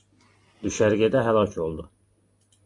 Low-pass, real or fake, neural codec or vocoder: 10.8 kHz; real; none